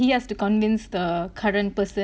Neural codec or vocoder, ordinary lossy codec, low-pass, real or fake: none; none; none; real